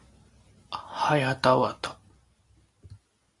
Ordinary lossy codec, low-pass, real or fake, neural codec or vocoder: Opus, 64 kbps; 10.8 kHz; real; none